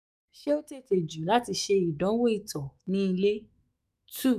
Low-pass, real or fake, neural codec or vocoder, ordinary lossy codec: 14.4 kHz; fake; autoencoder, 48 kHz, 128 numbers a frame, DAC-VAE, trained on Japanese speech; none